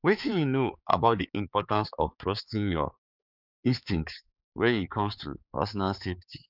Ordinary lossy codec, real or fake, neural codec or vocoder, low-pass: none; fake; codec, 16 kHz, 4 kbps, X-Codec, HuBERT features, trained on balanced general audio; 5.4 kHz